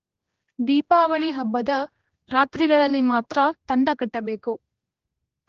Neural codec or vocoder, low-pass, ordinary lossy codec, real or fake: codec, 16 kHz, 1 kbps, X-Codec, HuBERT features, trained on general audio; 7.2 kHz; Opus, 16 kbps; fake